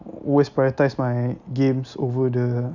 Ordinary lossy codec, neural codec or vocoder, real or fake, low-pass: none; none; real; 7.2 kHz